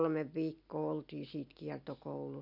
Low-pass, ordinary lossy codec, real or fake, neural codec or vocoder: 5.4 kHz; none; real; none